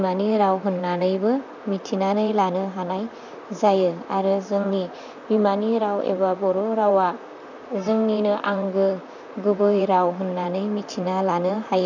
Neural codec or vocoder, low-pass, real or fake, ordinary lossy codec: vocoder, 44.1 kHz, 128 mel bands, Pupu-Vocoder; 7.2 kHz; fake; none